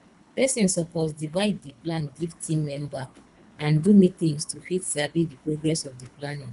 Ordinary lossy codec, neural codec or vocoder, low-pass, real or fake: none; codec, 24 kHz, 3 kbps, HILCodec; 10.8 kHz; fake